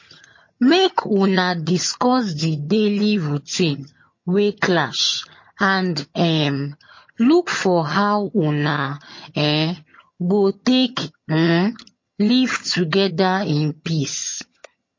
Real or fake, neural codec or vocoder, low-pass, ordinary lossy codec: fake; vocoder, 22.05 kHz, 80 mel bands, HiFi-GAN; 7.2 kHz; MP3, 32 kbps